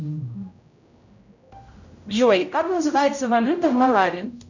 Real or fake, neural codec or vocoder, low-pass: fake; codec, 16 kHz, 0.5 kbps, X-Codec, HuBERT features, trained on general audio; 7.2 kHz